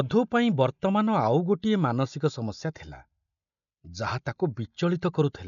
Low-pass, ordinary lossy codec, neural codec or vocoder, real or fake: 7.2 kHz; none; none; real